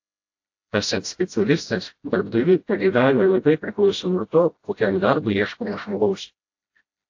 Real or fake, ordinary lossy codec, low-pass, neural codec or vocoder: fake; AAC, 48 kbps; 7.2 kHz; codec, 16 kHz, 0.5 kbps, FreqCodec, smaller model